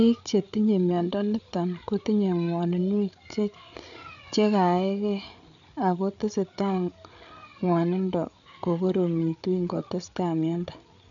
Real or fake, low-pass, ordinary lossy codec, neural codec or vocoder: fake; 7.2 kHz; none; codec, 16 kHz, 8 kbps, FreqCodec, larger model